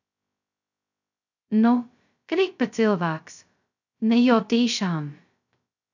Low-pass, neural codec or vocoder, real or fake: 7.2 kHz; codec, 16 kHz, 0.2 kbps, FocalCodec; fake